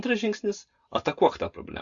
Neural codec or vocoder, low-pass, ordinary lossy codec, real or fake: none; 7.2 kHz; Opus, 64 kbps; real